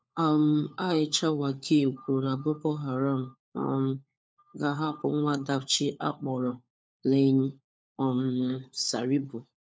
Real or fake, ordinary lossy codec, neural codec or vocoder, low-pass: fake; none; codec, 16 kHz, 4 kbps, FunCodec, trained on LibriTTS, 50 frames a second; none